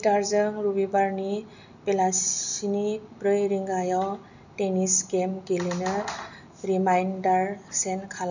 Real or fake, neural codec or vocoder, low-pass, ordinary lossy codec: real; none; 7.2 kHz; none